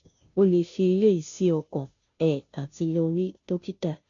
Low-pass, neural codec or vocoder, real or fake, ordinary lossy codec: 7.2 kHz; codec, 16 kHz, 0.5 kbps, FunCodec, trained on Chinese and English, 25 frames a second; fake; MP3, 64 kbps